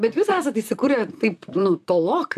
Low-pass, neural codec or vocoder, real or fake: 14.4 kHz; vocoder, 44.1 kHz, 128 mel bands, Pupu-Vocoder; fake